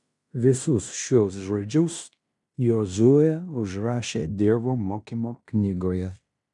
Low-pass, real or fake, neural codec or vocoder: 10.8 kHz; fake; codec, 16 kHz in and 24 kHz out, 0.9 kbps, LongCat-Audio-Codec, fine tuned four codebook decoder